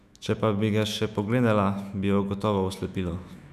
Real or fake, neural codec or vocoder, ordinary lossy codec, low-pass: fake; autoencoder, 48 kHz, 128 numbers a frame, DAC-VAE, trained on Japanese speech; none; 14.4 kHz